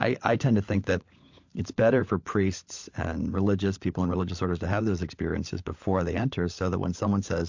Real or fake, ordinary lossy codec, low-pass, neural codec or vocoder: fake; MP3, 48 kbps; 7.2 kHz; codec, 16 kHz, 16 kbps, FunCodec, trained on LibriTTS, 50 frames a second